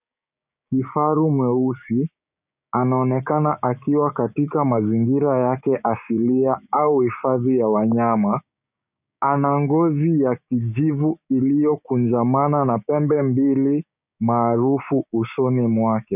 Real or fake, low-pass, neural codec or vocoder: fake; 3.6 kHz; codec, 16 kHz, 6 kbps, DAC